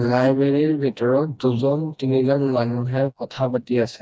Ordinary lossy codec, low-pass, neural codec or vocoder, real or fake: none; none; codec, 16 kHz, 1 kbps, FreqCodec, smaller model; fake